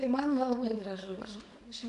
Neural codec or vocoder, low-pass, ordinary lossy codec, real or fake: codec, 24 kHz, 0.9 kbps, WavTokenizer, small release; 10.8 kHz; AAC, 64 kbps; fake